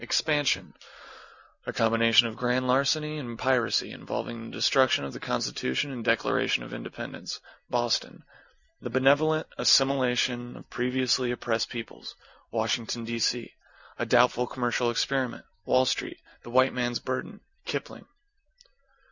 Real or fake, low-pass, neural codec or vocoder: real; 7.2 kHz; none